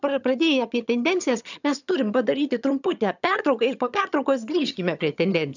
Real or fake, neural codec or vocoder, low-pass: fake; vocoder, 22.05 kHz, 80 mel bands, HiFi-GAN; 7.2 kHz